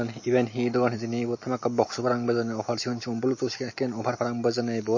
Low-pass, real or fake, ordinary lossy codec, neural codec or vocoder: 7.2 kHz; real; MP3, 32 kbps; none